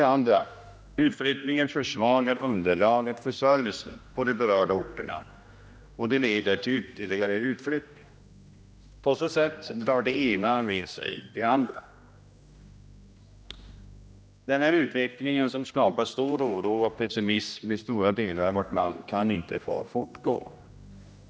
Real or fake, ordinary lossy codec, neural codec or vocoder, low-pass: fake; none; codec, 16 kHz, 1 kbps, X-Codec, HuBERT features, trained on general audio; none